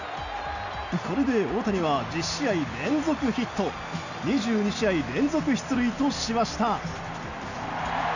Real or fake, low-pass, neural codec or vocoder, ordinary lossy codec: real; 7.2 kHz; none; none